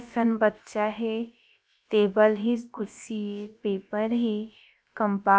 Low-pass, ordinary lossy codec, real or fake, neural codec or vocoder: none; none; fake; codec, 16 kHz, about 1 kbps, DyCAST, with the encoder's durations